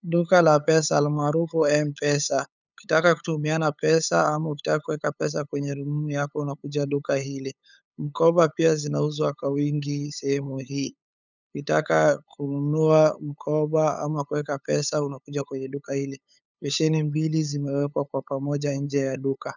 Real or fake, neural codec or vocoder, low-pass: fake; codec, 16 kHz, 8 kbps, FunCodec, trained on LibriTTS, 25 frames a second; 7.2 kHz